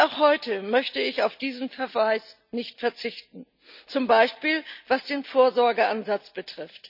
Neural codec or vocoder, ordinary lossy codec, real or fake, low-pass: none; none; real; 5.4 kHz